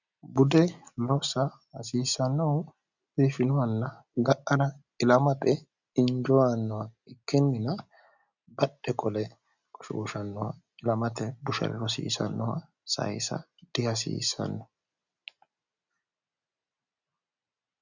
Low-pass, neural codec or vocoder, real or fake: 7.2 kHz; none; real